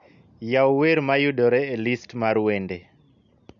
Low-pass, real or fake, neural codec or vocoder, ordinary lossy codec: 7.2 kHz; real; none; none